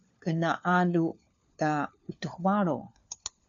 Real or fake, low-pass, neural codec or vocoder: fake; 7.2 kHz; codec, 16 kHz, 4 kbps, FunCodec, trained on LibriTTS, 50 frames a second